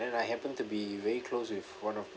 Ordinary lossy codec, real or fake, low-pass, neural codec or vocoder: none; real; none; none